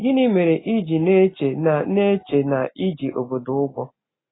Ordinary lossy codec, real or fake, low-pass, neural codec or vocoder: AAC, 16 kbps; real; 7.2 kHz; none